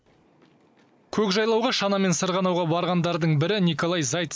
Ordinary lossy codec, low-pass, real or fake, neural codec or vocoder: none; none; real; none